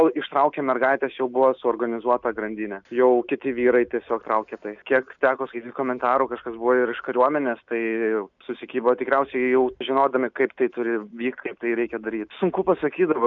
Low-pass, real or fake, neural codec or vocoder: 7.2 kHz; real; none